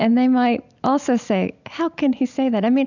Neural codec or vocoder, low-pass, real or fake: none; 7.2 kHz; real